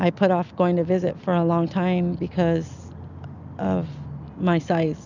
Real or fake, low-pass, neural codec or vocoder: real; 7.2 kHz; none